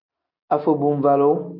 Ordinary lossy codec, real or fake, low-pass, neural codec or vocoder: MP3, 48 kbps; real; 5.4 kHz; none